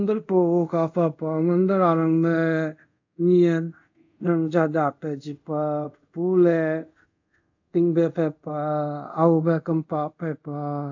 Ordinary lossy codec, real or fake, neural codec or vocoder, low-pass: AAC, 48 kbps; fake; codec, 24 kHz, 0.5 kbps, DualCodec; 7.2 kHz